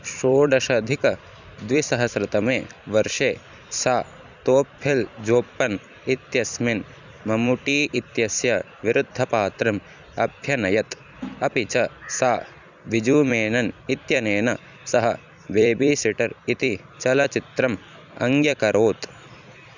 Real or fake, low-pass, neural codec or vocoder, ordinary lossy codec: fake; 7.2 kHz; vocoder, 44.1 kHz, 128 mel bands every 256 samples, BigVGAN v2; none